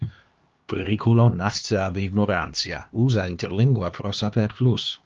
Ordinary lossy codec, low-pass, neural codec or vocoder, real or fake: Opus, 32 kbps; 7.2 kHz; codec, 16 kHz, 2 kbps, X-Codec, HuBERT features, trained on LibriSpeech; fake